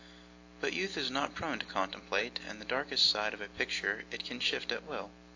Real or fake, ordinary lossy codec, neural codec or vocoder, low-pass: real; AAC, 48 kbps; none; 7.2 kHz